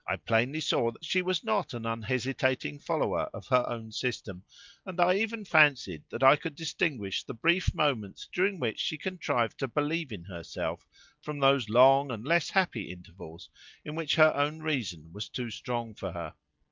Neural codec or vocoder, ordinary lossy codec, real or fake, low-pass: none; Opus, 24 kbps; real; 7.2 kHz